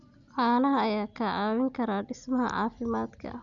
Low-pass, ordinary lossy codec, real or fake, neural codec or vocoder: 7.2 kHz; none; real; none